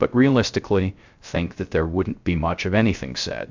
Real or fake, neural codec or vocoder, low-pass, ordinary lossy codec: fake; codec, 16 kHz, 0.3 kbps, FocalCodec; 7.2 kHz; MP3, 64 kbps